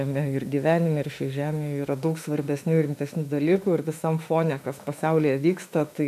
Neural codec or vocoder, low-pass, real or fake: autoencoder, 48 kHz, 32 numbers a frame, DAC-VAE, trained on Japanese speech; 14.4 kHz; fake